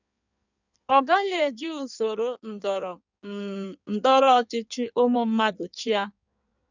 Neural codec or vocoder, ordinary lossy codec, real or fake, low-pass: codec, 16 kHz in and 24 kHz out, 1.1 kbps, FireRedTTS-2 codec; none; fake; 7.2 kHz